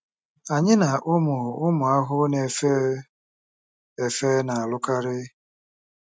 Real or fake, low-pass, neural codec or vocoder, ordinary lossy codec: real; none; none; none